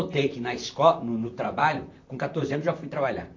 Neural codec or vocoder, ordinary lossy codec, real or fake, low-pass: vocoder, 44.1 kHz, 128 mel bands, Pupu-Vocoder; AAC, 48 kbps; fake; 7.2 kHz